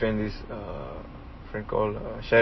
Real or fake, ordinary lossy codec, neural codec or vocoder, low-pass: real; MP3, 24 kbps; none; 7.2 kHz